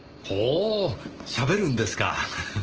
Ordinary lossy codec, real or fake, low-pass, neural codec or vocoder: Opus, 16 kbps; real; 7.2 kHz; none